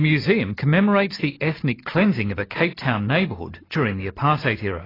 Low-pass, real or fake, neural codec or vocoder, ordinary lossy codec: 5.4 kHz; real; none; AAC, 24 kbps